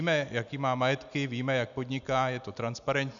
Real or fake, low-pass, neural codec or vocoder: real; 7.2 kHz; none